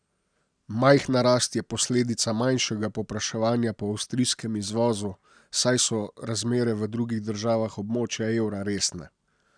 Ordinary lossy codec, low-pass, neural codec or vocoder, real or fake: none; 9.9 kHz; none; real